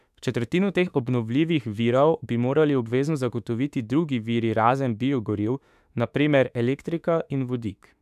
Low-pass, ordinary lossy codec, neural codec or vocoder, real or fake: 14.4 kHz; none; autoencoder, 48 kHz, 32 numbers a frame, DAC-VAE, trained on Japanese speech; fake